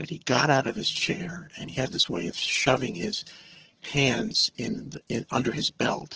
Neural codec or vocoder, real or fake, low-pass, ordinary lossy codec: vocoder, 22.05 kHz, 80 mel bands, HiFi-GAN; fake; 7.2 kHz; Opus, 16 kbps